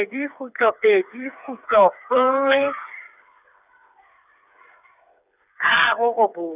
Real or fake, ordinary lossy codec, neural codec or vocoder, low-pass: fake; none; codec, 16 kHz, 4 kbps, FreqCodec, smaller model; 3.6 kHz